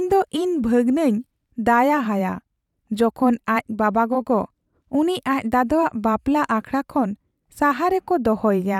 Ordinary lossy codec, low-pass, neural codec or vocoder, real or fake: none; 19.8 kHz; vocoder, 44.1 kHz, 128 mel bands every 512 samples, BigVGAN v2; fake